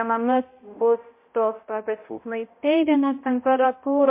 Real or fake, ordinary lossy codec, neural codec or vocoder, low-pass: fake; MP3, 24 kbps; codec, 16 kHz, 0.5 kbps, X-Codec, HuBERT features, trained on balanced general audio; 3.6 kHz